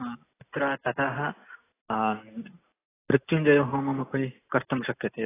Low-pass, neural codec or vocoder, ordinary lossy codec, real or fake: 3.6 kHz; none; AAC, 16 kbps; real